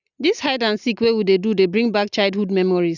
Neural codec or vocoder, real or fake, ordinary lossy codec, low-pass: none; real; none; 7.2 kHz